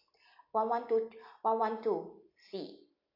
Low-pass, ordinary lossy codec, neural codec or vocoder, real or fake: 5.4 kHz; AAC, 32 kbps; none; real